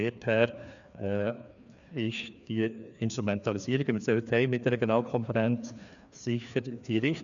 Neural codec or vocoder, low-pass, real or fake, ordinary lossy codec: codec, 16 kHz, 2 kbps, FreqCodec, larger model; 7.2 kHz; fake; none